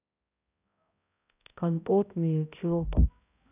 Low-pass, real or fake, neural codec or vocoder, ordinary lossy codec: 3.6 kHz; fake; codec, 16 kHz, 0.5 kbps, X-Codec, HuBERT features, trained on balanced general audio; none